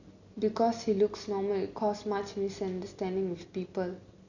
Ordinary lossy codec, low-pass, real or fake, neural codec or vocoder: none; 7.2 kHz; real; none